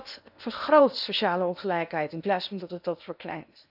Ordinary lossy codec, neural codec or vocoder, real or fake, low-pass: none; codec, 16 kHz in and 24 kHz out, 0.8 kbps, FocalCodec, streaming, 65536 codes; fake; 5.4 kHz